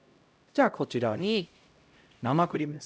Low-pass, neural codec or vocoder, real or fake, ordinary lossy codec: none; codec, 16 kHz, 0.5 kbps, X-Codec, HuBERT features, trained on LibriSpeech; fake; none